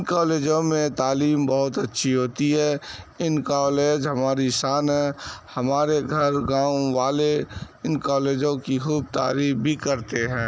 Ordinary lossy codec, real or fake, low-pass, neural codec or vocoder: none; real; none; none